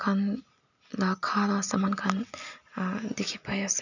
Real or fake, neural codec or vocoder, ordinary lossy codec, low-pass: real; none; none; 7.2 kHz